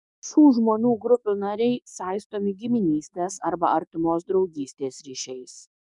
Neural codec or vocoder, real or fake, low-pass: codec, 44.1 kHz, 7.8 kbps, DAC; fake; 10.8 kHz